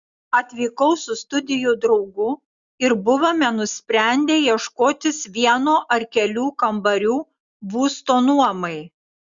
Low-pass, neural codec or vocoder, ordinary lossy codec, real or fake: 7.2 kHz; none; Opus, 64 kbps; real